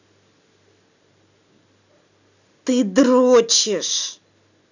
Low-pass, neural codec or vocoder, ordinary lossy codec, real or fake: 7.2 kHz; none; none; real